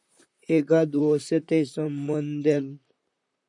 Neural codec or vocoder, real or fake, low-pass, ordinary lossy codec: vocoder, 44.1 kHz, 128 mel bands, Pupu-Vocoder; fake; 10.8 kHz; MP3, 96 kbps